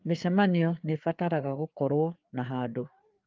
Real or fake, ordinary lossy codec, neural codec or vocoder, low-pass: fake; Opus, 32 kbps; codec, 16 kHz, 4 kbps, FreqCodec, larger model; 7.2 kHz